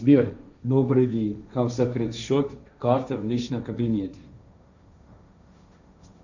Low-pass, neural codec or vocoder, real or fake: 7.2 kHz; codec, 16 kHz, 1.1 kbps, Voila-Tokenizer; fake